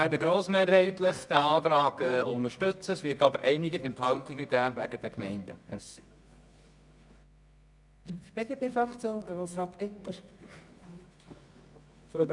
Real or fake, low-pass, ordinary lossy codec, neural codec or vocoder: fake; 10.8 kHz; none; codec, 24 kHz, 0.9 kbps, WavTokenizer, medium music audio release